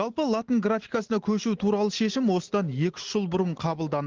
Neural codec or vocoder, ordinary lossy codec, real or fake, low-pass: none; Opus, 16 kbps; real; 7.2 kHz